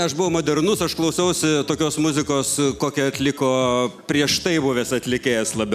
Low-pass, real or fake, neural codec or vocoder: 14.4 kHz; real; none